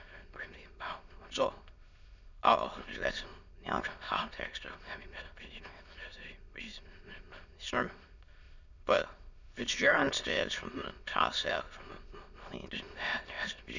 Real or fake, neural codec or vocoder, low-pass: fake; autoencoder, 22.05 kHz, a latent of 192 numbers a frame, VITS, trained on many speakers; 7.2 kHz